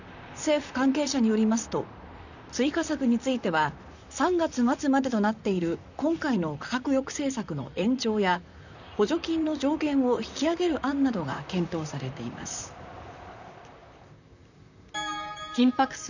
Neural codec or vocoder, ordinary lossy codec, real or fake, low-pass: vocoder, 44.1 kHz, 128 mel bands, Pupu-Vocoder; none; fake; 7.2 kHz